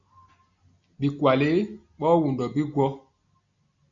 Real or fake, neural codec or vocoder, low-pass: real; none; 7.2 kHz